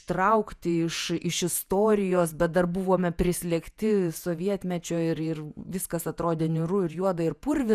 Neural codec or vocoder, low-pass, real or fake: vocoder, 48 kHz, 128 mel bands, Vocos; 14.4 kHz; fake